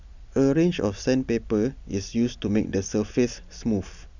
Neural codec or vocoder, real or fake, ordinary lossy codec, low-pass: none; real; none; 7.2 kHz